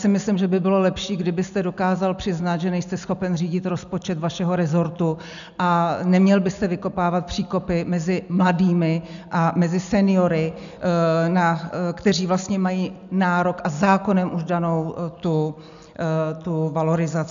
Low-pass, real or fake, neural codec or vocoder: 7.2 kHz; real; none